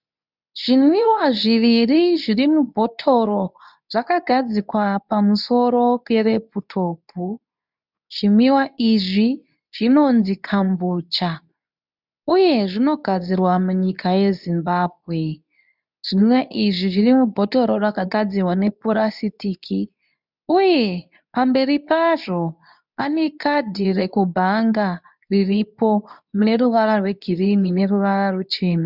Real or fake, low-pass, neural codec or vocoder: fake; 5.4 kHz; codec, 24 kHz, 0.9 kbps, WavTokenizer, medium speech release version 2